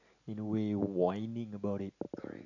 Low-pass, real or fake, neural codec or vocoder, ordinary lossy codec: 7.2 kHz; real; none; none